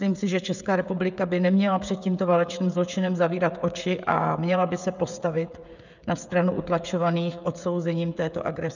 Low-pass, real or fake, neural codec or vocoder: 7.2 kHz; fake; codec, 16 kHz, 16 kbps, FreqCodec, smaller model